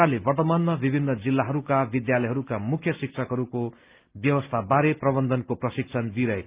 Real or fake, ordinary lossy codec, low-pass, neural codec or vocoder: real; Opus, 24 kbps; 3.6 kHz; none